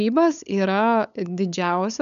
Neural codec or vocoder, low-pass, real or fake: codec, 16 kHz, 8 kbps, FunCodec, trained on LibriTTS, 25 frames a second; 7.2 kHz; fake